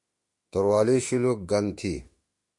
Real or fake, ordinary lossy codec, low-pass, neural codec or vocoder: fake; MP3, 48 kbps; 10.8 kHz; autoencoder, 48 kHz, 32 numbers a frame, DAC-VAE, trained on Japanese speech